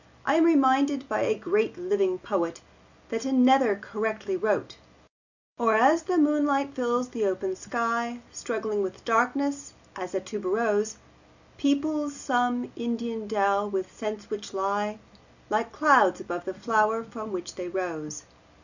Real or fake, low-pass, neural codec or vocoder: real; 7.2 kHz; none